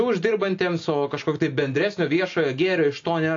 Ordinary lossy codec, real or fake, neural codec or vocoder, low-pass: AAC, 48 kbps; real; none; 7.2 kHz